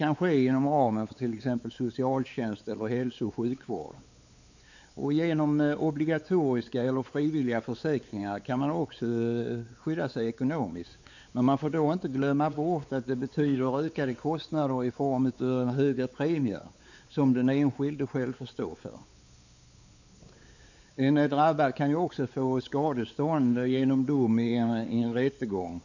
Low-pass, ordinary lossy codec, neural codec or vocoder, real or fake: 7.2 kHz; none; codec, 16 kHz, 4 kbps, X-Codec, WavLM features, trained on Multilingual LibriSpeech; fake